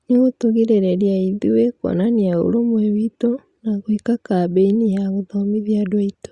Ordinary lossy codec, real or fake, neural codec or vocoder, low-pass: Opus, 64 kbps; real; none; 10.8 kHz